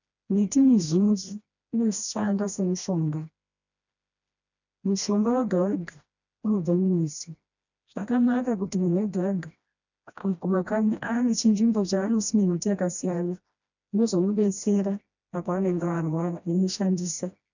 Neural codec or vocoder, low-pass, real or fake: codec, 16 kHz, 1 kbps, FreqCodec, smaller model; 7.2 kHz; fake